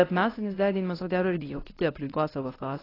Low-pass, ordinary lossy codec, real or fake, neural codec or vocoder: 5.4 kHz; AAC, 24 kbps; fake; codec, 24 kHz, 0.9 kbps, WavTokenizer, medium speech release version 1